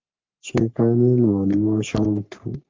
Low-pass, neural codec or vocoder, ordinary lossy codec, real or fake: 7.2 kHz; codec, 44.1 kHz, 3.4 kbps, Pupu-Codec; Opus, 32 kbps; fake